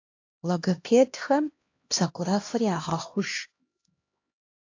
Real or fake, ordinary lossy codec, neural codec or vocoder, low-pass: fake; AAC, 48 kbps; codec, 16 kHz, 1 kbps, X-Codec, HuBERT features, trained on LibriSpeech; 7.2 kHz